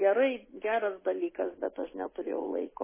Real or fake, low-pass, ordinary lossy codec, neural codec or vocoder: real; 3.6 kHz; MP3, 16 kbps; none